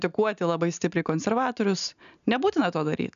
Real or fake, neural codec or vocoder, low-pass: real; none; 7.2 kHz